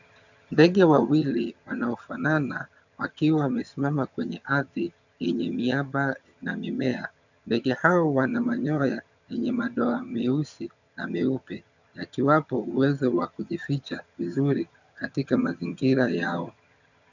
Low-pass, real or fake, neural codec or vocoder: 7.2 kHz; fake; vocoder, 22.05 kHz, 80 mel bands, HiFi-GAN